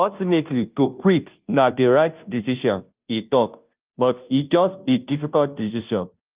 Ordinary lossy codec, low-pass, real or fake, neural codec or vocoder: Opus, 24 kbps; 3.6 kHz; fake; codec, 16 kHz, 0.5 kbps, FunCodec, trained on Chinese and English, 25 frames a second